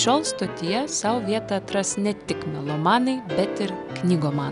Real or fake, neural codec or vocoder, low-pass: real; none; 10.8 kHz